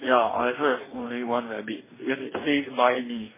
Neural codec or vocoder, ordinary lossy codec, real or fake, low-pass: codec, 44.1 kHz, 2.6 kbps, DAC; MP3, 16 kbps; fake; 3.6 kHz